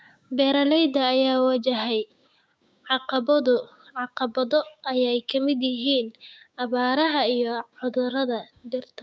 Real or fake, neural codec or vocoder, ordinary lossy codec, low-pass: fake; codec, 16 kHz, 6 kbps, DAC; none; none